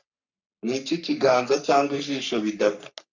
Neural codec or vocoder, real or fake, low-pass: codec, 44.1 kHz, 3.4 kbps, Pupu-Codec; fake; 7.2 kHz